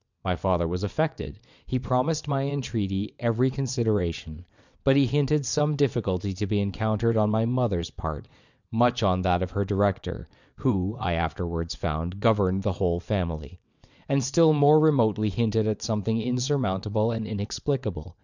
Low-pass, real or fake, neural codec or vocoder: 7.2 kHz; fake; vocoder, 22.05 kHz, 80 mel bands, WaveNeXt